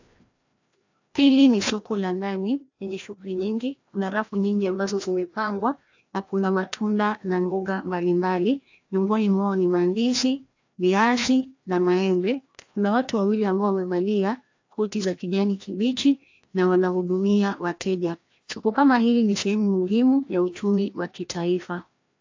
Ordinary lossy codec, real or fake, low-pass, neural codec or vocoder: AAC, 48 kbps; fake; 7.2 kHz; codec, 16 kHz, 1 kbps, FreqCodec, larger model